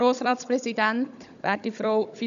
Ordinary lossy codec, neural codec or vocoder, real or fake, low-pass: none; codec, 16 kHz, 16 kbps, FunCodec, trained on Chinese and English, 50 frames a second; fake; 7.2 kHz